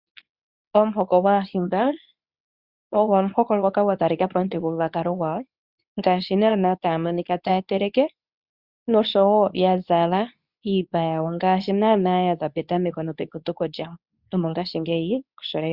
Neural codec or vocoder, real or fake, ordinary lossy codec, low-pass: codec, 24 kHz, 0.9 kbps, WavTokenizer, medium speech release version 2; fake; Opus, 64 kbps; 5.4 kHz